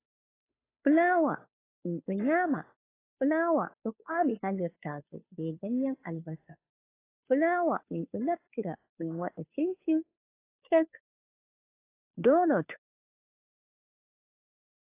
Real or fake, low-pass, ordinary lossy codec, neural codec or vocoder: fake; 3.6 kHz; AAC, 24 kbps; codec, 16 kHz, 2 kbps, FunCodec, trained on Chinese and English, 25 frames a second